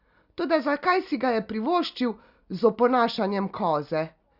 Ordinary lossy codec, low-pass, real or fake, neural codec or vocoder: Opus, 64 kbps; 5.4 kHz; real; none